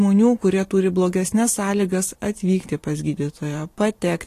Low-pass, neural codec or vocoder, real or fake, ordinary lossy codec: 14.4 kHz; vocoder, 44.1 kHz, 128 mel bands every 512 samples, BigVGAN v2; fake; AAC, 48 kbps